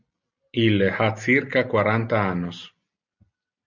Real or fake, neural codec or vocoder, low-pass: real; none; 7.2 kHz